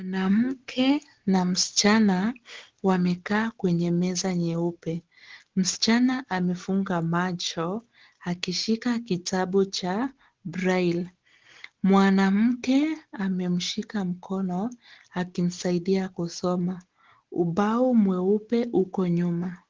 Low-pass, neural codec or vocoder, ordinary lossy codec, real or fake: 7.2 kHz; none; Opus, 16 kbps; real